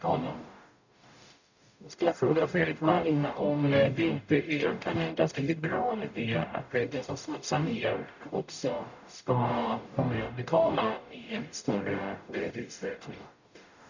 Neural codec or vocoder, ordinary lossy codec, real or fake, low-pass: codec, 44.1 kHz, 0.9 kbps, DAC; none; fake; 7.2 kHz